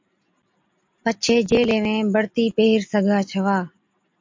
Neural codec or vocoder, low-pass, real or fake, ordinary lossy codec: none; 7.2 kHz; real; MP3, 48 kbps